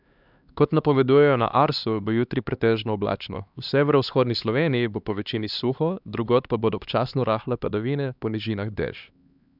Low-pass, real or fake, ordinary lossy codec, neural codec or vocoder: 5.4 kHz; fake; none; codec, 16 kHz, 2 kbps, X-Codec, HuBERT features, trained on LibriSpeech